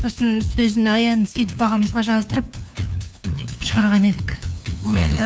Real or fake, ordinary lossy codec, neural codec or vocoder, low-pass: fake; none; codec, 16 kHz, 2 kbps, FunCodec, trained on LibriTTS, 25 frames a second; none